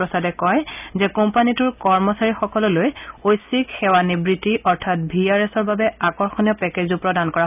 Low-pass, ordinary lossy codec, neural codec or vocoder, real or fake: 3.6 kHz; none; none; real